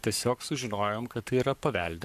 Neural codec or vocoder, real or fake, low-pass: codec, 44.1 kHz, 7.8 kbps, Pupu-Codec; fake; 14.4 kHz